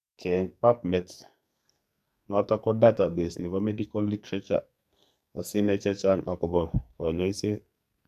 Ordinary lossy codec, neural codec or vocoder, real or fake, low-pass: none; codec, 44.1 kHz, 2.6 kbps, SNAC; fake; 14.4 kHz